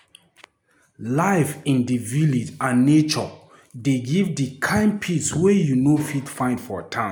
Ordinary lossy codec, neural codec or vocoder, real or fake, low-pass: none; none; real; none